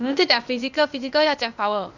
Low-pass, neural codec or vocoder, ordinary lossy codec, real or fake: 7.2 kHz; codec, 16 kHz, 0.8 kbps, ZipCodec; none; fake